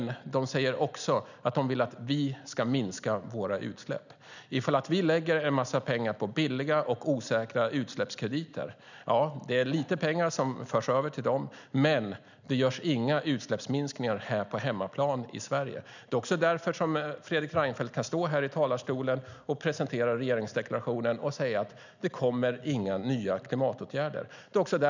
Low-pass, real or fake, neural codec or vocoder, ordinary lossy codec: 7.2 kHz; real; none; none